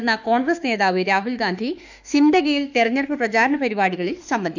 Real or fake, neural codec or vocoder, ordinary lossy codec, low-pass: fake; autoencoder, 48 kHz, 32 numbers a frame, DAC-VAE, trained on Japanese speech; none; 7.2 kHz